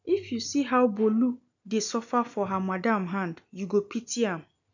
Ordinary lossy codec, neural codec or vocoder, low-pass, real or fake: none; none; 7.2 kHz; real